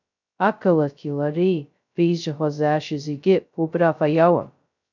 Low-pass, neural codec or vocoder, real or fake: 7.2 kHz; codec, 16 kHz, 0.2 kbps, FocalCodec; fake